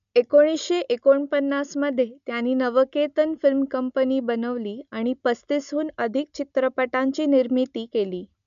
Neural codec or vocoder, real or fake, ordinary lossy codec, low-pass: none; real; MP3, 96 kbps; 7.2 kHz